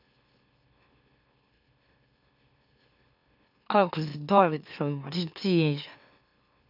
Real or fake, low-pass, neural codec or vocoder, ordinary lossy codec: fake; 5.4 kHz; autoencoder, 44.1 kHz, a latent of 192 numbers a frame, MeloTTS; none